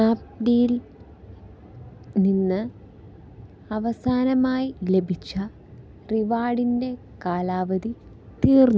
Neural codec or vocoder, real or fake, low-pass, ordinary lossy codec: none; real; none; none